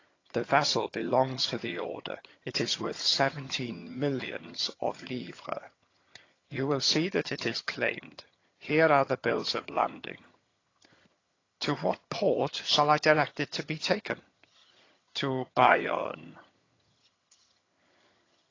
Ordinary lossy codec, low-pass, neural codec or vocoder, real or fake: AAC, 32 kbps; 7.2 kHz; vocoder, 22.05 kHz, 80 mel bands, HiFi-GAN; fake